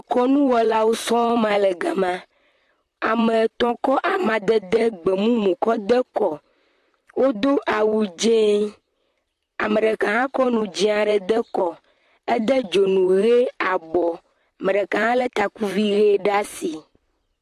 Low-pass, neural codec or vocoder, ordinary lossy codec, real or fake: 14.4 kHz; vocoder, 44.1 kHz, 128 mel bands, Pupu-Vocoder; AAC, 64 kbps; fake